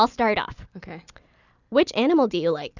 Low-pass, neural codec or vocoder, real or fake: 7.2 kHz; none; real